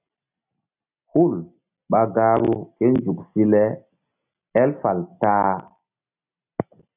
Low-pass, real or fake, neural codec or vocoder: 3.6 kHz; real; none